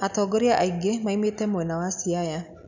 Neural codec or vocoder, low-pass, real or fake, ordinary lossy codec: none; 7.2 kHz; real; none